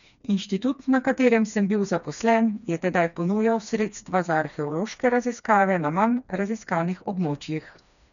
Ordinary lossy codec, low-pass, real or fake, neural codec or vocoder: none; 7.2 kHz; fake; codec, 16 kHz, 2 kbps, FreqCodec, smaller model